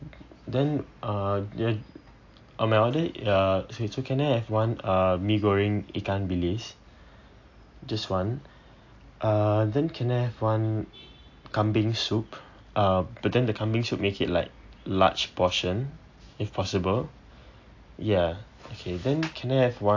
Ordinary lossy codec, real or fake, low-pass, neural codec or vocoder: AAC, 48 kbps; real; 7.2 kHz; none